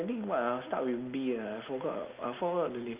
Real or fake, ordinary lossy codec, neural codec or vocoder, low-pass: real; Opus, 24 kbps; none; 3.6 kHz